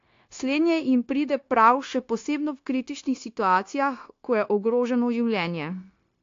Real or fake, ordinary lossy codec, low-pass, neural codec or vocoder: fake; AAC, 48 kbps; 7.2 kHz; codec, 16 kHz, 0.9 kbps, LongCat-Audio-Codec